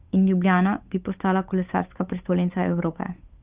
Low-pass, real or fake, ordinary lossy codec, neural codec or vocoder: 3.6 kHz; fake; Opus, 32 kbps; codec, 16 kHz, 8 kbps, FunCodec, trained on Chinese and English, 25 frames a second